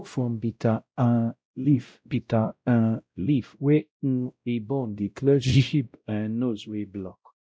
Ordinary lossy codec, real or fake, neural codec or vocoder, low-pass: none; fake; codec, 16 kHz, 0.5 kbps, X-Codec, WavLM features, trained on Multilingual LibriSpeech; none